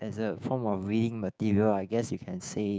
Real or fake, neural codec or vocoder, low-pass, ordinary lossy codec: fake; codec, 16 kHz, 6 kbps, DAC; none; none